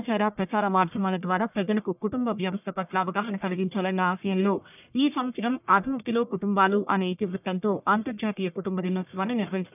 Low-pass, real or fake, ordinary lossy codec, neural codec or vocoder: 3.6 kHz; fake; none; codec, 44.1 kHz, 1.7 kbps, Pupu-Codec